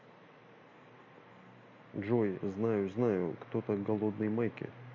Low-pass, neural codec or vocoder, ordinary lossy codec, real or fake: 7.2 kHz; none; MP3, 32 kbps; real